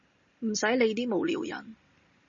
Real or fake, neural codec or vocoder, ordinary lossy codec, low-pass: real; none; MP3, 32 kbps; 7.2 kHz